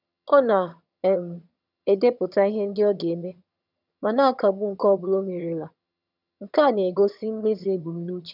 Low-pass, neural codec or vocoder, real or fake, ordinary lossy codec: 5.4 kHz; vocoder, 22.05 kHz, 80 mel bands, HiFi-GAN; fake; none